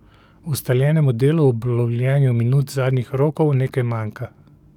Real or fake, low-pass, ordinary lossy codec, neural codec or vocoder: fake; 19.8 kHz; none; codec, 44.1 kHz, 7.8 kbps, DAC